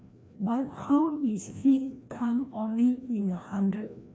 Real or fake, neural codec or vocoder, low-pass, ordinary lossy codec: fake; codec, 16 kHz, 1 kbps, FreqCodec, larger model; none; none